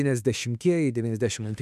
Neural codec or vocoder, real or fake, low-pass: autoencoder, 48 kHz, 32 numbers a frame, DAC-VAE, trained on Japanese speech; fake; 14.4 kHz